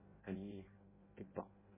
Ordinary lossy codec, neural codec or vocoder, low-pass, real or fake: MP3, 16 kbps; codec, 16 kHz in and 24 kHz out, 0.6 kbps, FireRedTTS-2 codec; 3.6 kHz; fake